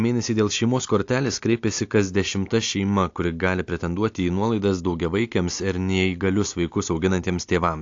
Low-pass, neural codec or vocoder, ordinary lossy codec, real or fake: 7.2 kHz; none; AAC, 48 kbps; real